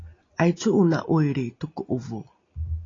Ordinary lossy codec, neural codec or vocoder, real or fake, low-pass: AAC, 32 kbps; none; real; 7.2 kHz